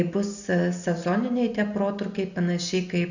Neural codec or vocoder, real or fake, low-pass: none; real; 7.2 kHz